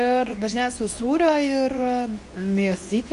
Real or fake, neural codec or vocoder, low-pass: fake; codec, 24 kHz, 0.9 kbps, WavTokenizer, medium speech release version 2; 10.8 kHz